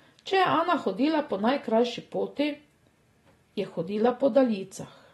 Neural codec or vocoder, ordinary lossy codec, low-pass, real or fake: none; AAC, 32 kbps; 19.8 kHz; real